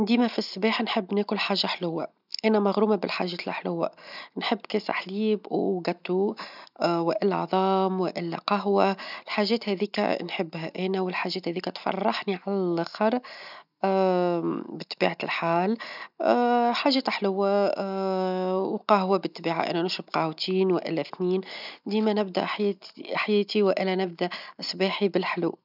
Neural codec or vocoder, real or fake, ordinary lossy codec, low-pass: none; real; none; 5.4 kHz